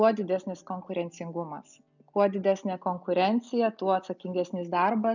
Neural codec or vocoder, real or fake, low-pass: none; real; 7.2 kHz